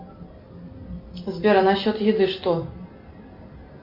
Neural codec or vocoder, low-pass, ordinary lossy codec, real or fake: none; 5.4 kHz; MP3, 48 kbps; real